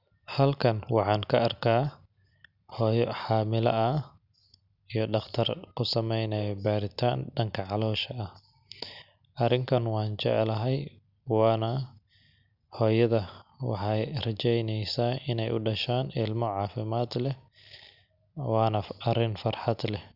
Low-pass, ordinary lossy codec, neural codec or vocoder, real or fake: 5.4 kHz; none; none; real